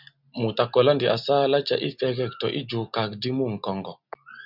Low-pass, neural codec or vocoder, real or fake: 5.4 kHz; none; real